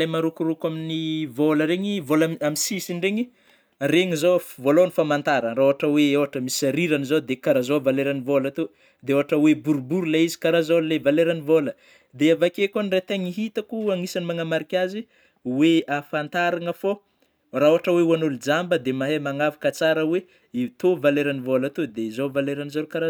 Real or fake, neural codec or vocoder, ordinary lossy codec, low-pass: real; none; none; none